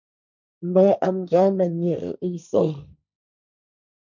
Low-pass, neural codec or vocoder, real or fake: 7.2 kHz; codec, 16 kHz, 1.1 kbps, Voila-Tokenizer; fake